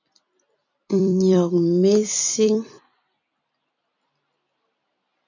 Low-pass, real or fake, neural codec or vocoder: 7.2 kHz; fake; vocoder, 44.1 kHz, 128 mel bands every 256 samples, BigVGAN v2